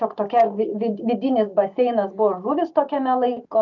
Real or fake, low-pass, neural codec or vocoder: real; 7.2 kHz; none